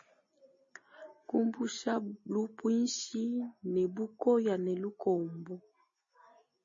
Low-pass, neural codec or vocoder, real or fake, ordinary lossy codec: 7.2 kHz; none; real; MP3, 32 kbps